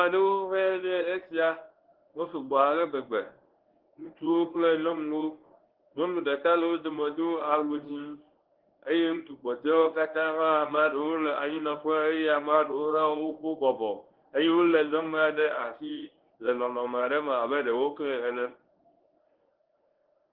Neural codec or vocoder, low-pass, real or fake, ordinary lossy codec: codec, 24 kHz, 0.9 kbps, WavTokenizer, medium speech release version 1; 5.4 kHz; fake; Opus, 16 kbps